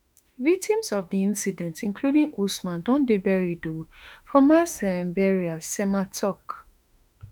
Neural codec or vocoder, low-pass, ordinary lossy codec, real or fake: autoencoder, 48 kHz, 32 numbers a frame, DAC-VAE, trained on Japanese speech; none; none; fake